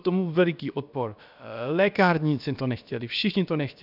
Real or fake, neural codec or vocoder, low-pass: fake; codec, 16 kHz, about 1 kbps, DyCAST, with the encoder's durations; 5.4 kHz